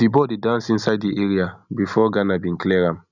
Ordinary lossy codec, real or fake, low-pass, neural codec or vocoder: none; real; 7.2 kHz; none